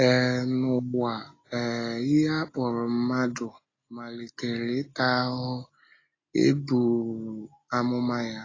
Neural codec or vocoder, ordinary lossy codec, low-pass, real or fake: none; AAC, 32 kbps; 7.2 kHz; real